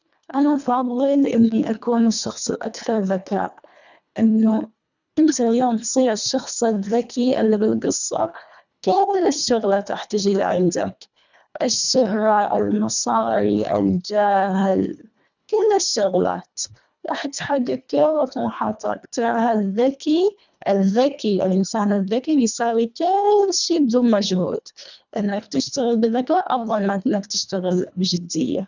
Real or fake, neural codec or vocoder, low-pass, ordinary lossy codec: fake; codec, 24 kHz, 1.5 kbps, HILCodec; 7.2 kHz; none